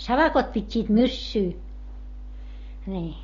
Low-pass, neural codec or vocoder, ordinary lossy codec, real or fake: 7.2 kHz; none; AAC, 32 kbps; real